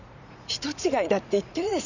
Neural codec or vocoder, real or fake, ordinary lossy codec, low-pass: vocoder, 44.1 kHz, 80 mel bands, Vocos; fake; none; 7.2 kHz